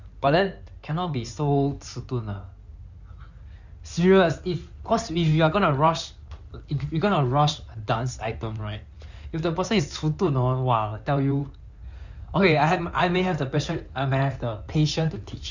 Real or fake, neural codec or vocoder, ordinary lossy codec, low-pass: fake; codec, 16 kHz in and 24 kHz out, 2.2 kbps, FireRedTTS-2 codec; none; 7.2 kHz